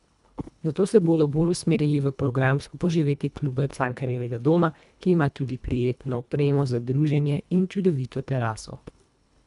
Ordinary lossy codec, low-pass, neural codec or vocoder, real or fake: none; 10.8 kHz; codec, 24 kHz, 1.5 kbps, HILCodec; fake